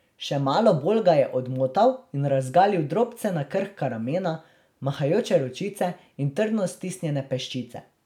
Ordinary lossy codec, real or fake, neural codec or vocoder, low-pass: none; real; none; 19.8 kHz